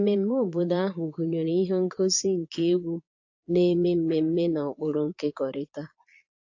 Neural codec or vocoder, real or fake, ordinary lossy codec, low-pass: vocoder, 22.05 kHz, 80 mel bands, Vocos; fake; AAC, 48 kbps; 7.2 kHz